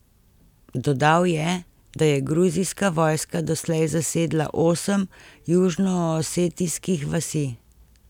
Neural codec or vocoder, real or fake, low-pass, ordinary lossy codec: none; real; 19.8 kHz; none